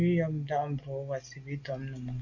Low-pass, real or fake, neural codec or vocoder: 7.2 kHz; real; none